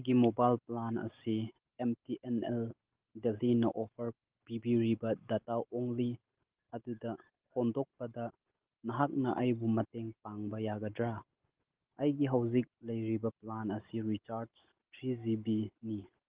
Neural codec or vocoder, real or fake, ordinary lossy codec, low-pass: none; real; Opus, 16 kbps; 3.6 kHz